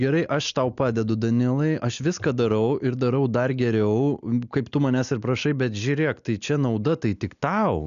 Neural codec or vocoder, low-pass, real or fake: none; 7.2 kHz; real